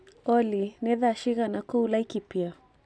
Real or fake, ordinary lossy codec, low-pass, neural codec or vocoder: real; none; none; none